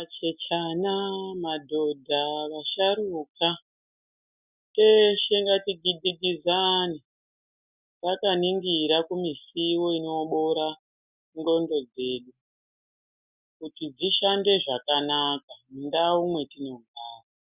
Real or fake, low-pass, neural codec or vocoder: real; 3.6 kHz; none